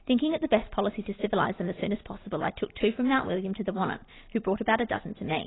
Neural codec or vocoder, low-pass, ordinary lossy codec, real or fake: none; 7.2 kHz; AAC, 16 kbps; real